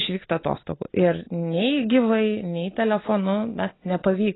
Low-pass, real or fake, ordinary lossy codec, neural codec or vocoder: 7.2 kHz; real; AAC, 16 kbps; none